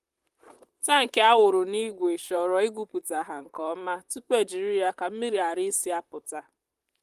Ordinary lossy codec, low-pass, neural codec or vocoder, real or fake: Opus, 32 kbps; 14.4 kHz; vocoder, 44.1 kHz, 128 mel bands, Pupu-Vocoder; fake